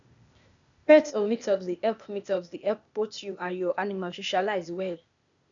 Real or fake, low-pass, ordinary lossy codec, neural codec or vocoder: fake; 7.2 kHz; none; codec, 16 kHz, 0.8 kbps, ZipCodec